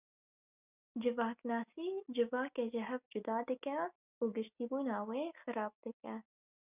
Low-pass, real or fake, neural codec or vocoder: 3.6 kHz; real; none